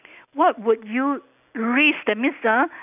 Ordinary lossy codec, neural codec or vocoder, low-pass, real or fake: none; none; 3.6 kHz; real